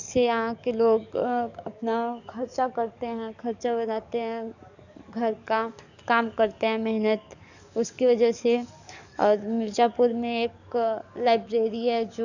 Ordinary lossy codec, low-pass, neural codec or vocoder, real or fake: none; 7.2 kHz; codec, 44.1 kHz, 7.8 kbps, DAC; fake